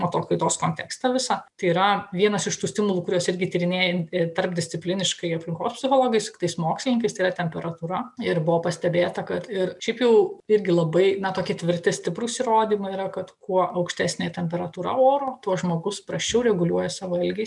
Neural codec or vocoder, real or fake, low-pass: none; real; 10.8 kHz